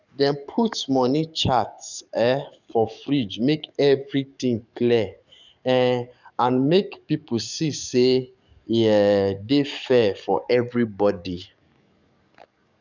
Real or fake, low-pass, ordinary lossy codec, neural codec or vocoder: fake; 7.2 kHz; none; codec, 44.1 kHz, 7.8 kbps, DAC